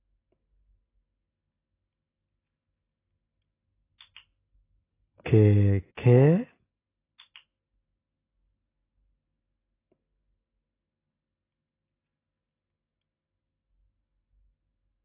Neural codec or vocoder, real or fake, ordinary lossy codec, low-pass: none; real; AAC, 16 kbps; 3.6 kHz